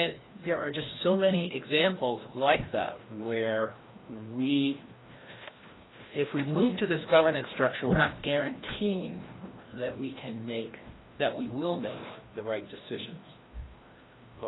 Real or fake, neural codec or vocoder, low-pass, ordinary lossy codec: fake; codec, 16 kHz, 1 kbps, FreqCodec, larger model; 7.2 kHz; AAC, 16 kbps